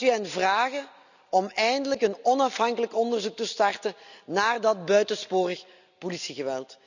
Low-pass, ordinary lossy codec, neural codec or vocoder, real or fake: 7.2 kHz; none; none; real